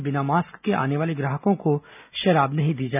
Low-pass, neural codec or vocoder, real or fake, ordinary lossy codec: 3.6 kHz; none; real; none